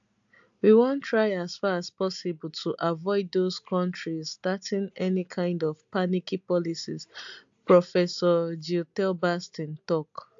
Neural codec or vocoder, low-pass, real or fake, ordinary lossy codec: none; 7.2 kHz; real; AAC, 64 kbps